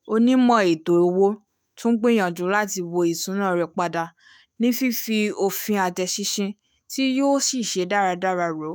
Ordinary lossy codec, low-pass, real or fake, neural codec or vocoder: none; none; fake; autoencoder, 48 kHz, 128 numbers a frame, DAC-VAE, trained on Japanese speech